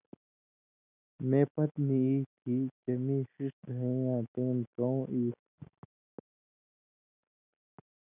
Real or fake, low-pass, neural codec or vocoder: real; 3.6 kHz; none